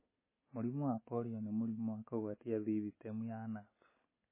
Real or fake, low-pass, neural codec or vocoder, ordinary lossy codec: real; 3.6 kHz; none; MP3, 16 kbps